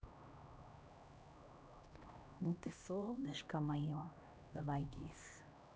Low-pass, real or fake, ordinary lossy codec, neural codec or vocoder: none; fake; none; codec, 16 kHz, 1 kbps, X-Codec, HuBERT features, trained on LibriSpeech